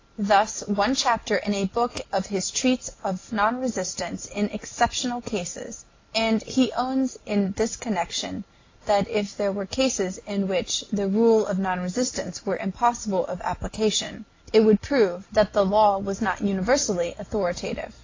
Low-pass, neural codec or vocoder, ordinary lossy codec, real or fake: 7.2 kHz; none; AAC, 32 kbps; real